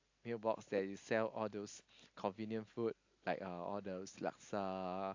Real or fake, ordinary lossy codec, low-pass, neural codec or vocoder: real; AAC, 48 kbps; 7.2 kHz; none